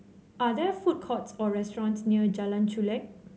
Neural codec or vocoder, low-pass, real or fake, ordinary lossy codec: none; none; real; none